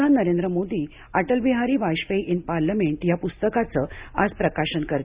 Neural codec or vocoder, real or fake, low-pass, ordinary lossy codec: none; real; 3.6 kHz; Opus, 64 kbps